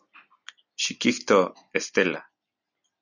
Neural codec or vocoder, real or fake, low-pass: none; real; 7.2 kHz